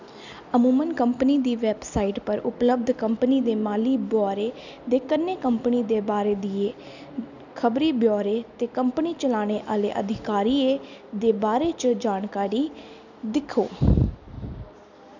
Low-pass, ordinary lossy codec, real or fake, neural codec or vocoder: 7.2 kHz; none; real; none